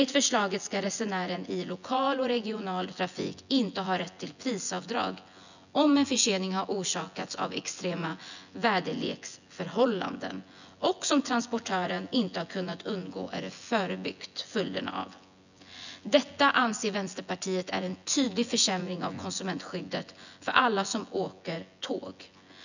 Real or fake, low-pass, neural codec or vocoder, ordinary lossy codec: fake; 7.2 kHz; vocoder, 24 kHz, 100 mel bands, Vocos; none